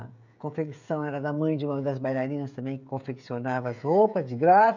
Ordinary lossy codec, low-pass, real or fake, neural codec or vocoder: none; 7.2 kHz; fake; codec, 16 kHz, 16 kbps, FreqCodec, smaller model